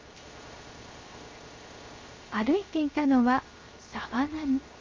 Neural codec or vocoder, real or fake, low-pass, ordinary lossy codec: codec, 16 kHz, 0.7 kbps, FocalCodec; fake; 7.2 kHz; Opus, 32 kbps